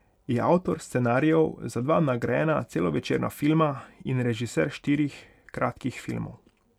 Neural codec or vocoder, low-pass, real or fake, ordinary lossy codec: none; 19.8 kHz; real; none